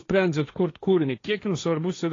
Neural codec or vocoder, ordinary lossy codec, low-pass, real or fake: codec, 16 kHz, 1.1 kbps, Voila-Tokenizer; AAC, 32 kbps; 7.2 kHz; fake